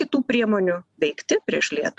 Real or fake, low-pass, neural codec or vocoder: real; 10.8 kHz; none